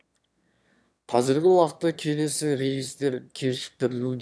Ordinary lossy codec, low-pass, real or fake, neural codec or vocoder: none; none; fake; autoencoder, 22.05 kHz, a latent of 192 numbers a frame, VITS, trained on one speaker